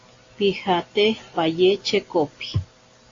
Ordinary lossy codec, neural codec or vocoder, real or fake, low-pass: AAC, 32 kbps; none; real; 7.2 kHz